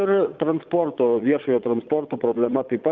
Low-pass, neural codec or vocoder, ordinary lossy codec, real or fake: 7.2 kHz; vocoder, 22.05 kHz, 80 mel bands, Vocos; Opus, 24 kbps; fake